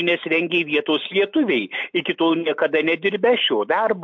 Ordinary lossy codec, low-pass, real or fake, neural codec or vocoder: MP3, 48 kbps; 7.2 kHz; real; none